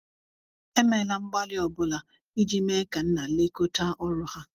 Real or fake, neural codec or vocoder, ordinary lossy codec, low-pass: real; none; Opus, 24 kbps; 14.4 kHz